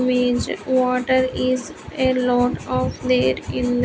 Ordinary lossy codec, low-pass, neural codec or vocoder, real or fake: none; none; none; real